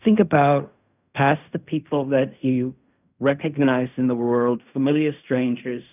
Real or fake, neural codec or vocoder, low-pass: fake; codec, 16 kHz in and 24 kHz out, 0.4 kbps, LongCat-Audio-Codec, fine tuned four codebook decoder; 3.6 kHz